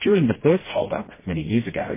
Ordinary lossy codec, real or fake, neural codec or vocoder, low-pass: MP3, 16 kbps; fake; codec, 16 kHz, 1 kbps, FreqCodec, smaller model; 3.6 kHz